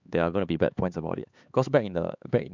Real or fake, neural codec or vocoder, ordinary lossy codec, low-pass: fake; codec, 16 kHz, 4 kbps, X-Codec, HuBERT features, trained on LibriSpeech; MP3, 64 kbps; 7.2 kHz